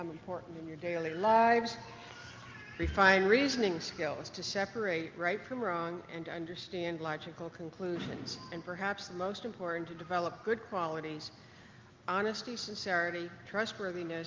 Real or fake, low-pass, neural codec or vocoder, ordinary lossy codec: real; 7.2 kHz; none; Opus, 32 kbps